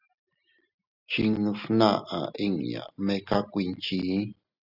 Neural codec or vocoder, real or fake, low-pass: none; real; 5.4 kHz